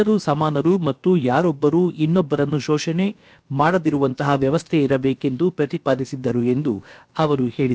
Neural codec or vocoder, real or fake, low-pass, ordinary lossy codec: codec, 16 kHz, about 1 kbps, DyCAST, with the encoder's durations; fake; none; none